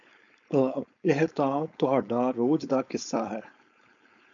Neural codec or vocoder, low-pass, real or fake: codec, 16 kHz, 4.8 kbps, FACodec; 7.2 kHz; fake